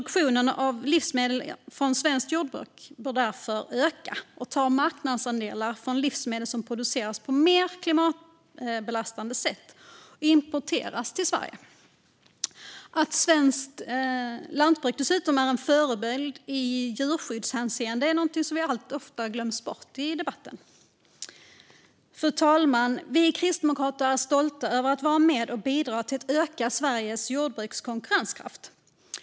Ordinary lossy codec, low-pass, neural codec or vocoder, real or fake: none; none; none; real